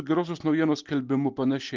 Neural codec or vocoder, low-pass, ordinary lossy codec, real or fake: none; 7.2 kHz; Opus, 32 kbps; real